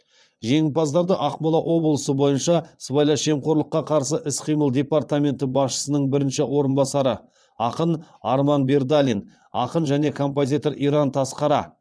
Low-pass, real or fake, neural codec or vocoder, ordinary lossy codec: none; fake; vocoder, 22.05 kHz, 80 mel bands, Vocos; none